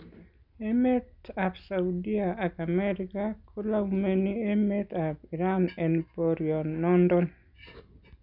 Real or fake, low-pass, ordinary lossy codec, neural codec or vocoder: real; 5.4 kHz; none; none